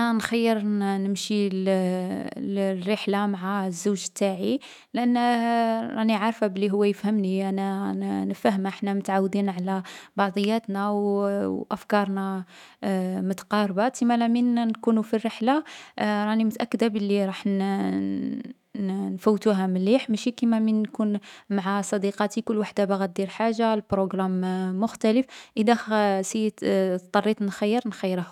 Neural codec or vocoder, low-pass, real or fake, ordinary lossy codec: autoencoder, 48 kHz, 128 numbers a frame, DAC-VAE, trained on Japanese speech; 19.8 kHz; fake; none